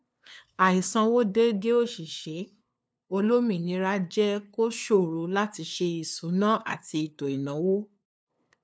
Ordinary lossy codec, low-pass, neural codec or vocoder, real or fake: none; none; codec, 16 kHz, 2 kbps, FunCodec, trained on LibriTTS, 25 frames a second; fake